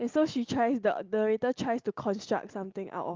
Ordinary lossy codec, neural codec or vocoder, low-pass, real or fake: Opus, 32 kbps; none; 7.2 kHz; real